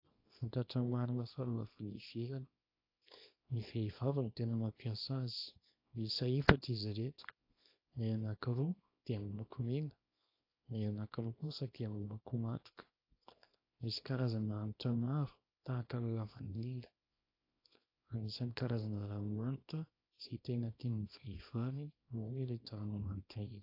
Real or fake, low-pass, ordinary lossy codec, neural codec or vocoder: fake; 5.4 kHz; AAC, 32 kbps; codec, 24 kHz, 0.9 kbps, WavTokenizer, small release